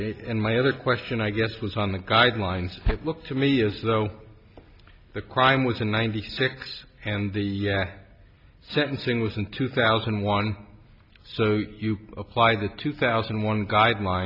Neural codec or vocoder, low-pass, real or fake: none; 5.4 kHz; real